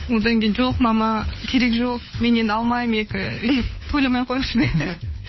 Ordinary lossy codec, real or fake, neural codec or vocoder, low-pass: MP3, 24 kbps; fake; codec, 16 kHz, 4 kbps, FunCodec, trained on LibriTTS, 50 frames a second; 7.2 kHz